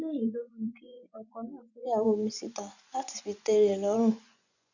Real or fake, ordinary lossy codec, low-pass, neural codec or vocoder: fake; none; 7.2 kHz; vocoder, 24 kHz, 100 mel bands, Vocos